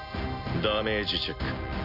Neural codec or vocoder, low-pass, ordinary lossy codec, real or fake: none; 5.4 kHz; none; real